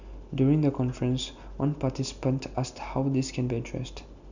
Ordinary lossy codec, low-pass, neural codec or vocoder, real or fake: none; 7.2 kHz; none; real